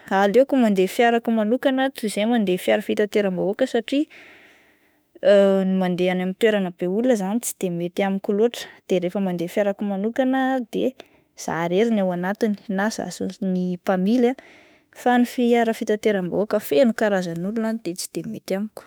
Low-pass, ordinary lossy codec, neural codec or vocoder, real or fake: none; none; autoencoder, 48 kHz, 32 numbers a frame, DAC-VAE, trained on Japanese speech; fake